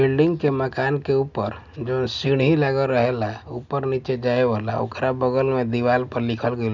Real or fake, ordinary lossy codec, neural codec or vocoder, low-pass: real; none; none; 7.2 kHz